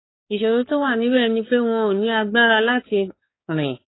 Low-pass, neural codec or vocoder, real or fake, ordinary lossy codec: 7.2 kHz; codec, 16 kHz, 4 kbps, X-Codec, HuBERT features, trained on balanced general audio; fake; AAC, 16 kbps